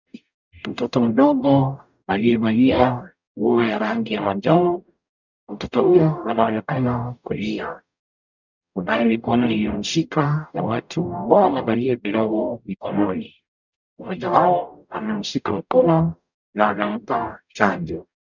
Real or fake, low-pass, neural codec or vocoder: fake; 7.2 kHz; codec, 44.1 kHz, 0.9 kbps, DAC